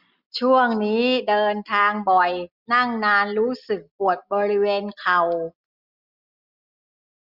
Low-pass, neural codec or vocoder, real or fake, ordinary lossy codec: 5.4 kHz; none; real; Opus, 64 kbps